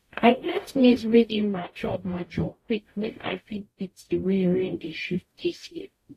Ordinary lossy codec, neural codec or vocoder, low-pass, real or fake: AAC, 48 kbps; codec, 44.1 kHz, 0.9 kbps, DAC; 14.4 kHz; fake